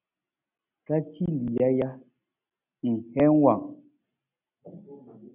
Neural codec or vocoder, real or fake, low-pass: none; real; 3.6 kHz